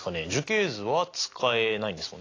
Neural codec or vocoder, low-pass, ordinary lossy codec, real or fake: none; 7.2 kHz; AAC, 32 kbps; real